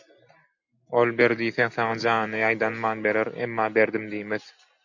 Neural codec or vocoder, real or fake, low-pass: none; real; 7.2 kHz